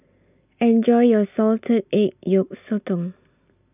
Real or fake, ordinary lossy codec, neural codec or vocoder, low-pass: real; none; none; 3.6 kHz